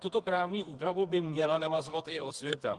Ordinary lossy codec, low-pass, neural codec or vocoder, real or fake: Opus, 24 kbps; 10.8 kHz; codec, 24 kHz, 0.9 kbps, WavTokenizer, medium music audio release; fake